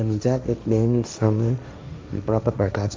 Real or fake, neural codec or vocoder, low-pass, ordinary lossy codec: fake; codec, 16 kHz, 1.1 kbps, Voila-Tokenizer; none; none